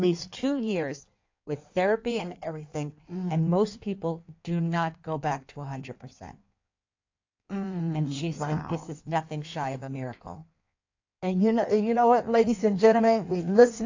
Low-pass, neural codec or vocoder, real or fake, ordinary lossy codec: 7.2 kHz; codec, 16 kHz in and 24 kHz out, 1.1 kbps, FireRedTTS-2 codec; fake; AAC, 48 kbps